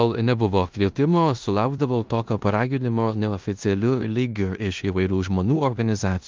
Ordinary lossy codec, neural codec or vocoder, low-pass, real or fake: Opus, 32 kbps; codec, 16 kHz in and 24 kHz out, 0.9 kbps, LongCat-Audio-Codec, four codebook decoder; 7.2 kHz; fake